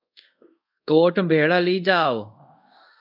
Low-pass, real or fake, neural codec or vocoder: 5.4 kHz; fake; codec, 24 kHz, 0.5 kbps, DualCodec